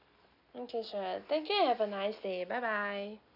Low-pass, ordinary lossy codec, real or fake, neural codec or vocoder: 5.4 kHz; none; real; none